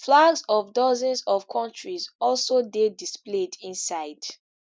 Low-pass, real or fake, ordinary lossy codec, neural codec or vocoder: none; real; none; none